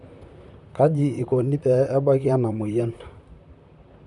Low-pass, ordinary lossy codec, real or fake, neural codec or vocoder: 10.8 kHz; none; fake; vocoder, 44.1 kHz, 128 mel bands, Pupu-Vocoder